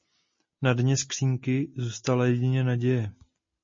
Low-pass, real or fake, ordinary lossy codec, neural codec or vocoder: 7.2 kHz; real; MP3, 32 kbps; none